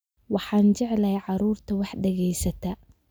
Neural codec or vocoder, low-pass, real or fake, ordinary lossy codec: none; none; real; none